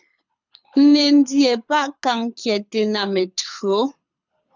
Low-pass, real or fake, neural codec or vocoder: 7.2 kHz; fake; codec, 24 kHz, 6 kbps, HILCodec